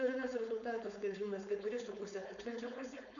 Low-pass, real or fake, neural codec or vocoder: 7.2 kHz; fake; codec, 16 kHz, 4.8 kbps, FACodec